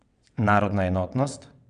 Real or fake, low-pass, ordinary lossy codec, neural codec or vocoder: fake; 9.9 kHz; none; vocoder, 22.05 kHz, 80 mel bands, WaveNeXt